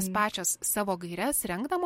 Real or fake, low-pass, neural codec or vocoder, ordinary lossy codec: real; 19.8 kHz; none; MP3, 64 kbps